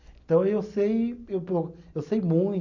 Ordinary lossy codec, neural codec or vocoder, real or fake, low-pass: none; none; real; 7.2 kHz